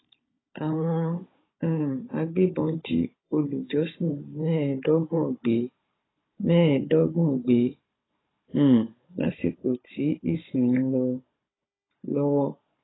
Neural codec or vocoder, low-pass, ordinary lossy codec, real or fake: codec, 16 kHz, 16 kbps, FunCodec, trained on Chinese and English, 50 frames a second; 7.2 kHz; AAC, 16 kbps; fake